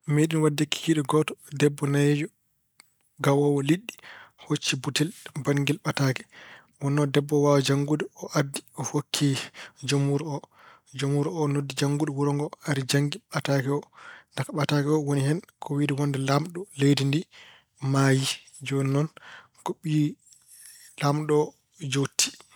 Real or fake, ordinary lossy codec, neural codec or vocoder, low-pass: real; none; none; none